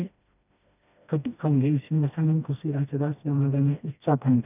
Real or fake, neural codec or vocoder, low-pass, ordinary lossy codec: fake; codec, 16 kHz, 1 kbps, FreqCodec, smaller model; 3.6 kHz; none